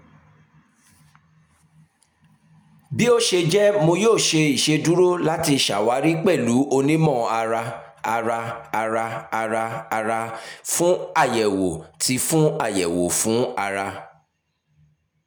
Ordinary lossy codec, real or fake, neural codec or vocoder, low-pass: none; real; none; none